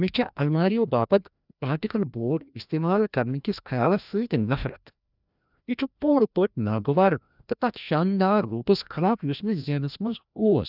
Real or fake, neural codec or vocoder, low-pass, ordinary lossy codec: fake; codec, 16 kHz, 1 kbps, FreqCodec, larger model; 5.4 kHz; none